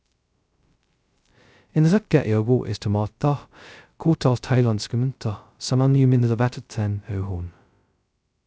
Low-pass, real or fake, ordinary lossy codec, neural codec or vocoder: none; fake; none; codec, 16 kHz, 0.2 kbps, FocalCodec